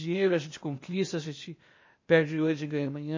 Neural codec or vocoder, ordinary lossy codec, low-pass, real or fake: codec, 16 kHz, 0.8 kbps, ZipCodec; MP3, 32 kbps; 7.2 kHz; fake